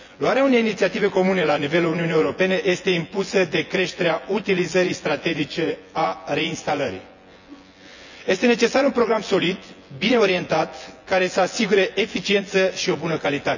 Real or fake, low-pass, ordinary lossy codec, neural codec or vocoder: fake; 7.2 kHz; none; vocoder, 24 kHz, 100 mel bands, Vocos